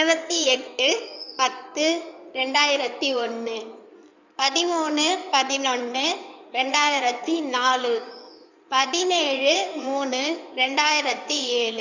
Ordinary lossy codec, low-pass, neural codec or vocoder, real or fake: none; 7.2 kHz; codec, 16 kHz in and 24 kHz out, 2.2 kbps, FireRedTTS-2 codec; fake